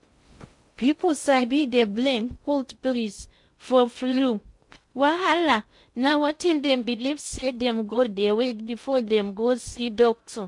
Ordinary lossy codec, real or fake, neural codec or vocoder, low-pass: MP3, 64 kbps; fake; codec, 16 kHz in and 24 kHz out, 0.6 kbps, FocalCodec, streaming, 2048 codes; 10.8 kHz